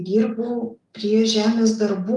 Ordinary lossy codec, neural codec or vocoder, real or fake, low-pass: Opus, 32 kbps; none; real; 10.8 kHz